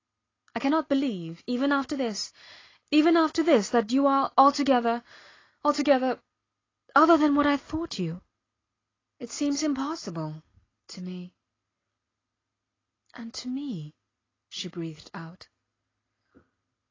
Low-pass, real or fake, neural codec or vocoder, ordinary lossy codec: 7.2 kHz; real; none; AAC, 32 kbps